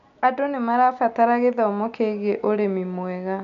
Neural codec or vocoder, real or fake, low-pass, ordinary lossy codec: none; real; 7.2 kHz; none